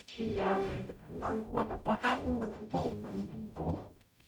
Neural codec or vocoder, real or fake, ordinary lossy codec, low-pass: codec, 44.1 kHz, 0.9 kbps, DAC; fake; none; 19.8 kHz